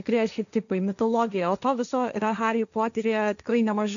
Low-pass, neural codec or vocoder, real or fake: 7.2 kHz; codec, 16 kHz, 1.1 kbps, Voila-Tokenizer; fake